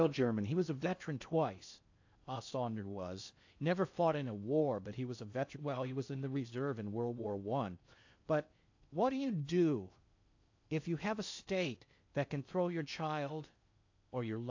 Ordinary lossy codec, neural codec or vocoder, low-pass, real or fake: AAC, 48 kbps; codec, 16 kHz in and 24 kHz out, 0.6 kbps, FocalCodec, streaming, 4096 codes; 7.2 kHz; fake